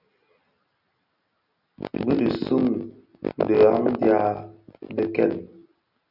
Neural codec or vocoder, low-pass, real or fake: none; 5.4 kHz; real